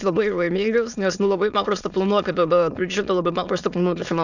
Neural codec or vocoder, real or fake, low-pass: autoencoder, 22.05 kHz, a latent of 192 numbers a frame, VITS, trained on many speakers; fake; 7.2 kHz